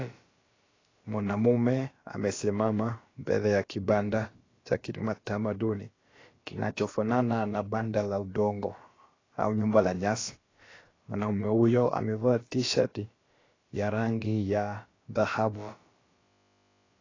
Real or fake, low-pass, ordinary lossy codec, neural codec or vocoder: fake; 7.2 kHz; AAC, 32 kbps; codec, 16 kHz, about 1 kbps, DyCAST, with the encoder's durations